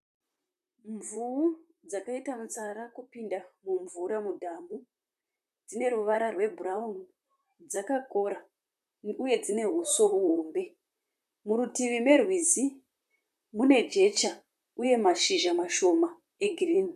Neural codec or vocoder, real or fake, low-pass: vocoder, 44.1 kHz, 128 mel bands, Pupu-Vocoder; fake; 14.4 kHz